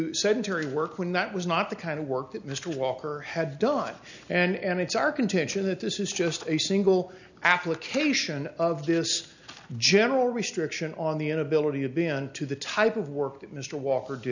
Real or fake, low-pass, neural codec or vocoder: real; 7.2 kHz; none